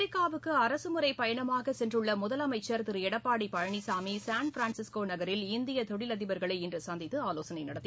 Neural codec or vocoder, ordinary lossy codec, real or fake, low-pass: none; none; real; none